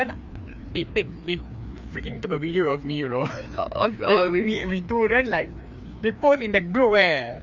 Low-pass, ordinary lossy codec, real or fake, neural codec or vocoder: 7.2 kHz; none; fake; codec, 16 kHz, 2 kbps, FreqCodec, larger model